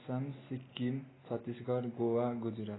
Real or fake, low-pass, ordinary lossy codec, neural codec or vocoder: real; 7.2 kHz; AAC, 16 kbps; none